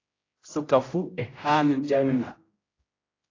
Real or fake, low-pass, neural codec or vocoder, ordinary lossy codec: fake; 7.2 kHz; codec, 16 kHz, 0.5 kbps, X-Codec, HuBERT features, trained on general audio; AAC, 32 kbps